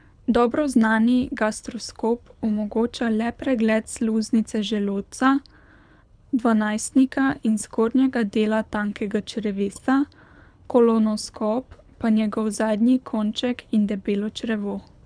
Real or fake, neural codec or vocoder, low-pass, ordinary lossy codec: fake; codec, 24 kHz, 6 kbps, HILCodec; 9.9 kHz; none